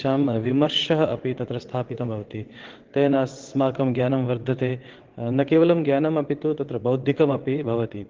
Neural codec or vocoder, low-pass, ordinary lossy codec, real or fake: vocoder, 22.05 kHz, 80 mel bands, WaveNeXt; 7.2 kHz; Opus, 16 kbps; fake